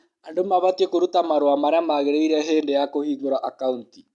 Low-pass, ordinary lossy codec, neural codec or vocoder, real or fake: 10.8 kHz; MP3, 64 kbps; none; real